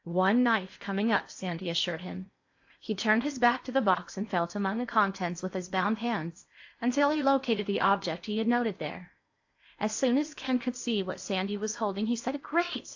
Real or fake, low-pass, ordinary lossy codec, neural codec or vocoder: fake; 7.2 kHz; AAC, 48 kbps; codec, 16 kHz in and 24 kHz out, 0.8 kbps, FocalCodec, streaming, 65536 codes